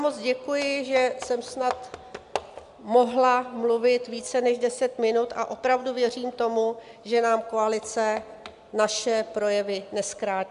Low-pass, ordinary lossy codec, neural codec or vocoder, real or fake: 10.8 kHz; MP3, 96 kbps; none; real